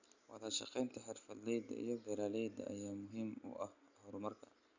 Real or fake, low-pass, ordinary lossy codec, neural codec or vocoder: real; 7.2 kHz; Opus, 64 kbps; none